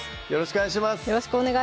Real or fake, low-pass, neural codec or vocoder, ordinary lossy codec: real; none; none; none